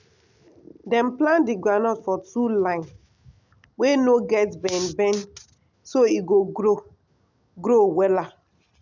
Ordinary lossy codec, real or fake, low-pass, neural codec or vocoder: none; real; 7.2 kHz; none